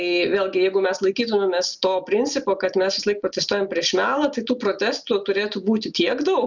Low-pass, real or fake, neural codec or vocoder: 7.2 kHz; real; none